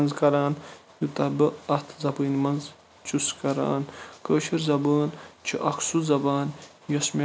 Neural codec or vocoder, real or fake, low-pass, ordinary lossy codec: none; real; none; none